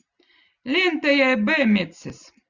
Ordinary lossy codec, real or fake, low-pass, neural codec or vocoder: Opus, 64 kbps; real; 7.2 kHz; none